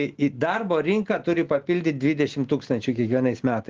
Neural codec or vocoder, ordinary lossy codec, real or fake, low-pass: none; Opus, 16 kbps; real; 7.2 kHz